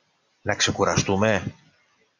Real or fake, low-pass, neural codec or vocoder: real; 7.2 kHz; none